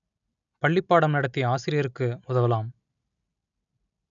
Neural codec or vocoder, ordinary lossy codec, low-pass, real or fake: none; none; 7.2 kHz; real